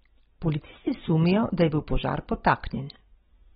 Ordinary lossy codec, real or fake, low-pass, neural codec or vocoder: AAC, 16 kbps; real; 19.8 kHz; none